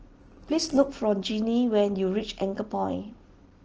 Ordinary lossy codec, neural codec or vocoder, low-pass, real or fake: Opus, 16 kbps; none; 7.2 kHz; real